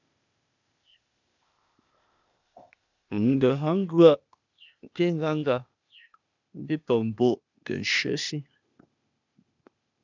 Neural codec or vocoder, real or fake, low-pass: codec, 16 kHz, 0.8 kbps, ZipCodec; fake; 7.2 kHz